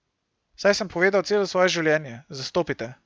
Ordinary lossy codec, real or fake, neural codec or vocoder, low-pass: none; real; none; none